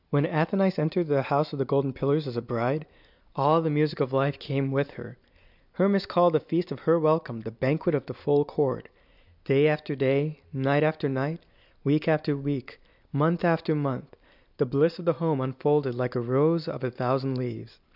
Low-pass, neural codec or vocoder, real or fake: 5.4 kHz; none; real